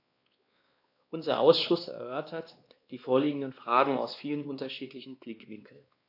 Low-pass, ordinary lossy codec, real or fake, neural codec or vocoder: 5.4 kHz; AAC, 32 kbps; fake; codec, 16 kHz, 2 kbps, X-Codec, WavLM features, trained on Multilingual LibriSpeech